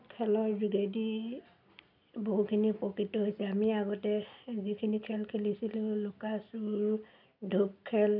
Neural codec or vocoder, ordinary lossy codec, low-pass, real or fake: none; none; 5.4 kHz; real